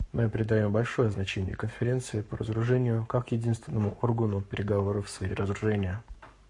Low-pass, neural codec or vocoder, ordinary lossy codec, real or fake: 10.8 kHz; codec, 44.1 kHz, 7.8 kbps, Pupu-Codec; MP3, 48 kbps; fake